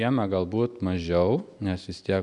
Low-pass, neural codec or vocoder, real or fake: 10.8 kHz; none; real